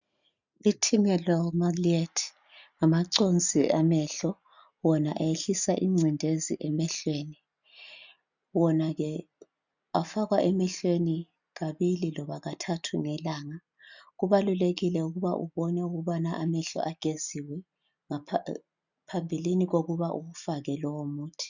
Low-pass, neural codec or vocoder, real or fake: 7.2 kHz; none; real